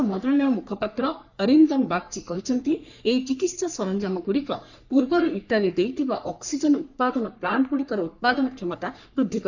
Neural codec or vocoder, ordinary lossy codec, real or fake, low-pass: codec, 44.1 kHz, 3.4 kbps, Pupu-Codec; none; fake; 7.2 kHz